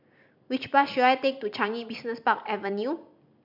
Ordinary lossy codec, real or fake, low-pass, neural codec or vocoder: MP3, 48 kbps; real; 5.4 kHz; none